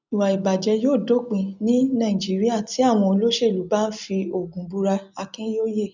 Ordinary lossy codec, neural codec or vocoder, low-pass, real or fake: none; none; 7.2 kHz; real